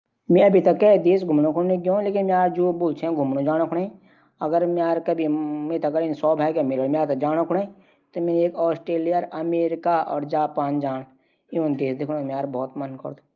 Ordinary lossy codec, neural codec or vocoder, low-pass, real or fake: Opus, 24 kbps; none; 7.2 kHz; real